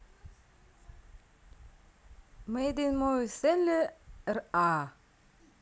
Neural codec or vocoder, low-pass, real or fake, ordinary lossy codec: none; none; real; none